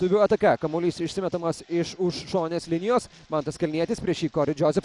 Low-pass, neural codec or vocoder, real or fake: 10.8 kHz; none; real